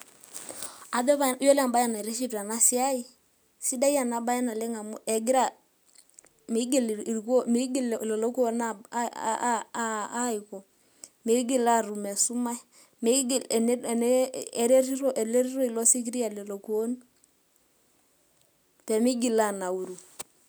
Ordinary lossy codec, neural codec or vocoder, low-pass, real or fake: none; none; none; real